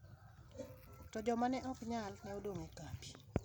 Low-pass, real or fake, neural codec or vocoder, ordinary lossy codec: none; real; none; none